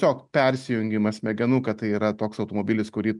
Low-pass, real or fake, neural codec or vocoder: 10.8 kHz; real; none